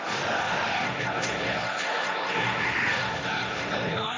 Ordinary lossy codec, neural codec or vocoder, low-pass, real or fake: none; codec, 16 kHz, 1.1 kbps, Voila-Tokenizer; none; fake